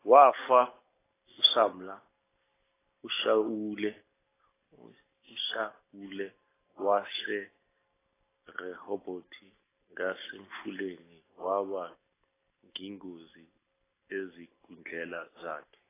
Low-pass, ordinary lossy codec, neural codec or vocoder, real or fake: 3.6 kHz; AAC, 16 kbps; none; real